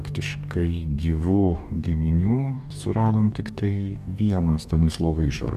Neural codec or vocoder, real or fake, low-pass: codec, 44.1 kHz, 2.6 kbps, DAC; fake; 14.4 kHz